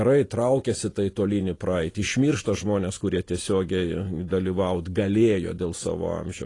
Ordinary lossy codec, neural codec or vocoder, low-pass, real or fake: AAC, 32 kbps; none; 10.8 kHz; real